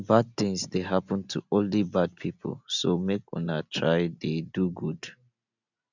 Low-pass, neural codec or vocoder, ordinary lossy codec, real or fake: 7.2 kHz; none; none; real